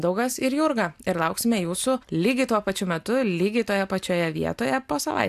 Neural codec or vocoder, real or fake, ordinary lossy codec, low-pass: none; real; AAC, 96 kbps; 14.4 kHz